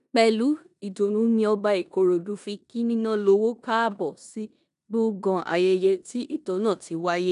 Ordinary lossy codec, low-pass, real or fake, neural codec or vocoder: none; 10.8 kHz; fake; codec, 16 kHz in and 24 kHz out, 0.9 kbps, LongCat-Audio-Codec, four codebook decoder